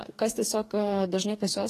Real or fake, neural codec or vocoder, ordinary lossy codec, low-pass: fake; codec, 44.1 kHz, 2.6 kbps, DAC; AAC, 48 kbps; 14.4 kHz